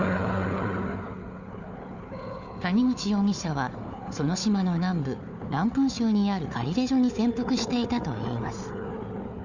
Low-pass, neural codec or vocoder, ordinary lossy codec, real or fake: 7.2 kHz; codec, 16 kHz, 4 kbps, FunCodec, trained on Chinese and English, 50 frames a second; Opus, 64 kbps; fake